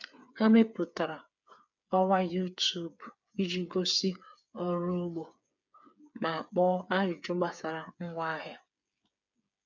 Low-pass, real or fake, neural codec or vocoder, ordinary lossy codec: 7.2 kHz; fake; codec, 16 kHz, 8 kbps, FreqCodec, smaller model; none